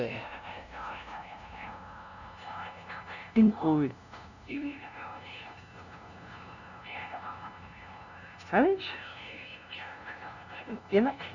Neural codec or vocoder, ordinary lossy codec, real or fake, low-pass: codec, 16 kHz, 0.5 kbps, FunCodec, trained on LibriTTS, 25 frames a second; none; fake; 7.2 kHz